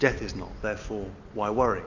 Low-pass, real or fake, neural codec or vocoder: 7.2 kHz; real; none